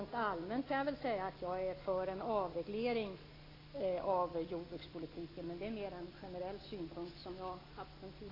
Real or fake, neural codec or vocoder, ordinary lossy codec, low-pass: real; none; AAC, 24 kbps; 5.4 kHz